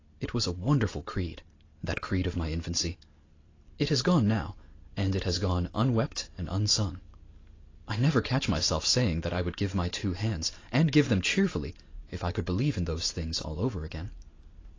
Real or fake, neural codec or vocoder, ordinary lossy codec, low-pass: real; none; AAC, 32 kbps; 7.2 kHz